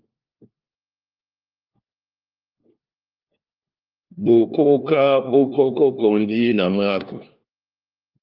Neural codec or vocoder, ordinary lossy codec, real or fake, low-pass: codec, 16 kHz, 1 kbps, FunCodec, trained on LibriTTS, 50 frames a second; Opus, 24 kbps; fake; 5.4 kHz